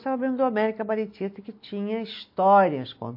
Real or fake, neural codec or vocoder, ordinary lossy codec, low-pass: real; none; MP3, 32 kbps; 5.4 kHz